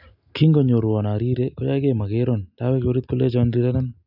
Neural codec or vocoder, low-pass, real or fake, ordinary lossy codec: none; 5.4 kHz; real; none